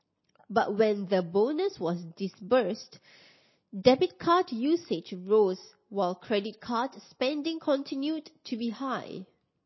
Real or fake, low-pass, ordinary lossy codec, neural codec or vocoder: real; 7.2 kHz; MP3, 24 kbps; none